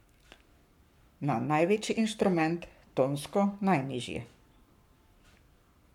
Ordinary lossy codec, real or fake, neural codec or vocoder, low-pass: MP3, 96 kbps; fake; codec, 44.1 kHz, 7.8 kbps, Pupu-Codec; 19.8 kHz